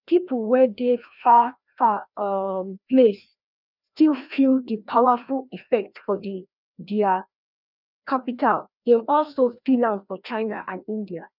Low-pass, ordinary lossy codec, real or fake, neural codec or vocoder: 5.4 kHz; none; fake; codec, 16 kHz, 1 kbps, FreqCodec, larger model